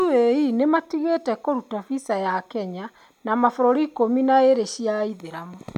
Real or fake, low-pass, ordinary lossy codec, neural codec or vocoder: real; 19.8 kHz; none; none